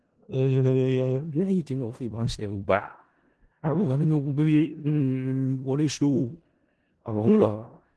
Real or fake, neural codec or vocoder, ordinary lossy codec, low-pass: fake; codec, 16 kHz in and 24 kHz out, 0.4 kbps, LongCat-Audio-Codec, four codebook decoder; Opus, 16 kbps; 10.8 kHz